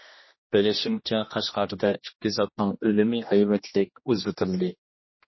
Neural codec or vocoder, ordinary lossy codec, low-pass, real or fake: codec, 16 kHz, 1 kbps, X-Codec, HuBERT features, trained on general audio; MP3, 24 kbps; 7.2 kHz; fake